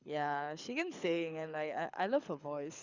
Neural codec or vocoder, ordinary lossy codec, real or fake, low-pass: codec, 24 kHz, 6 kbps, HILCodec; Opus, 64 kbps; fake; 7.2 kHz